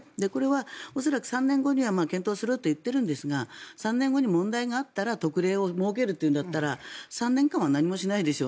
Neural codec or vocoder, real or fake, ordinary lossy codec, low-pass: none; real; none; none